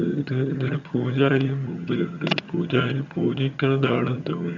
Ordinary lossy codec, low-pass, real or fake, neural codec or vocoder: MP3, 64 kbps; 7.2 kHz; fake; vocoder, 22.05 kHz, 80 mel bands, HiFi-GAN